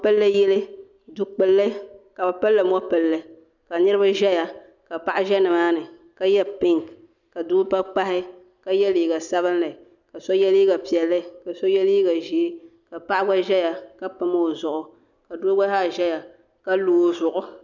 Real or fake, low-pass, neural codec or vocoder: real; 7.2 kHz; none